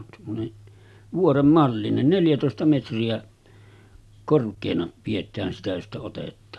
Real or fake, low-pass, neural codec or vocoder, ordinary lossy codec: real; none; none; none